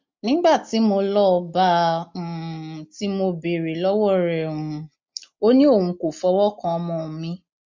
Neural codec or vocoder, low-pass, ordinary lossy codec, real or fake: none; 7.2 kHz; MP3, 48 kbps; real